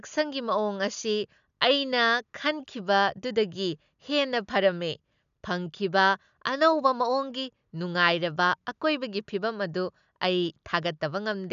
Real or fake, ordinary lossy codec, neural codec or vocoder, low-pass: real; none; none; 7.2 kHz